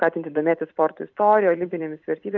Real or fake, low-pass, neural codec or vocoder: fake; 7.2 kHz; vocoder, 44.1 kHz, 128 mel bands every 256 samples, BigVGAN v2